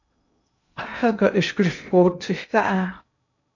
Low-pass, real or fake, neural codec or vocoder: 7.2 kHz; fake; codec, 16 kHz in and 24 kHz out, 0.6 kbps, FocalCodec, streaming, 2048 codes